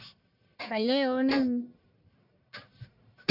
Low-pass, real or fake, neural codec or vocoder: 5.4 kHz; fake; codec, 44.1 kHz, 1.7 kbps, Pupu-Codec